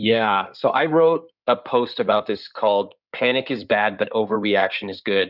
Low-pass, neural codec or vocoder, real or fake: 5.4 kHz; codec, 16 kHz in and 24 kHz out, 2.2 kbps, FireRedTTS-2 codec; fake